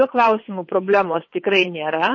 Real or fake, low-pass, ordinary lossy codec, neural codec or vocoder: fake; 7.2 kHz; MP3, 32 kbps; vocoder, 22.05 kHz, 80 mel bands, WaveNeXt